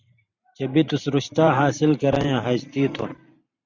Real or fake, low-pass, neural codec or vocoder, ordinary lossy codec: fake; 7.2 kHz; vocoder, 44.1 kHz, 128 mel bands every 512 samples, BigVGAN v2; Opus, 64 kbps